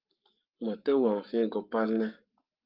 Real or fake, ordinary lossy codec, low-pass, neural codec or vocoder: fake; Opus, 32 kbps; 5.4 kHz; codec, 44.1 kHz, 7.8 kbps, Pupu-Codec